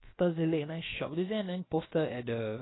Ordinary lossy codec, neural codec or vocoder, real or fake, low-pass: AAC, 16 kbps; codec, 16 kHz, about 1 kbps, DyCAST, with the encoder's durations; fake; 7.2 kHz